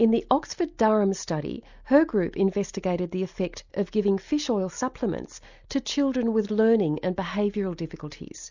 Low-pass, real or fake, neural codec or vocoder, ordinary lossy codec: 7.2 kHz; real; none; Opus, 64 kbps